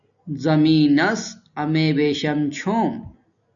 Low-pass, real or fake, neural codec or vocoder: 7.2 kHz; real; none